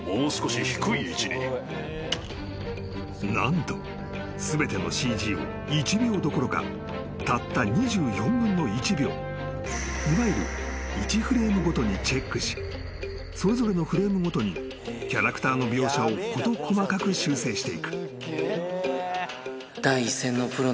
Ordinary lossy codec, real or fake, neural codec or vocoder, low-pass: none; real; none; none